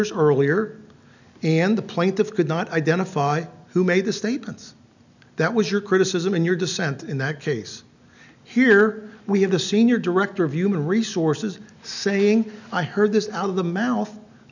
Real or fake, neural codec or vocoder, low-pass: real; none; 7.2 kHz